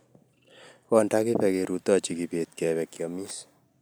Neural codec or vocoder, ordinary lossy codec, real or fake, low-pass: none; none; real; none